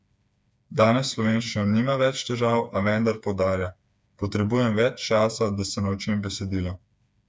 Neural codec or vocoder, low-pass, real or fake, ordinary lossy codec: codec, 16 kHz, 4 kbps, FreqCodec, smaller model; none; fake; none